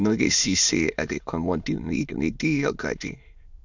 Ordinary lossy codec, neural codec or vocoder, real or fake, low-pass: none; autoencoder, 22.05 kHz, a latent of 192 numbers a frame, VITS, trained on many speakers; fake; 7.2 kHz